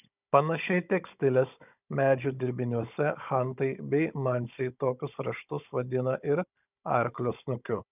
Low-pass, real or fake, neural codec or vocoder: 3.6 kHz; fake; codec, 16 kHz, 16 kbps, FunCodec, trained on Chinese and English, 50 frames a second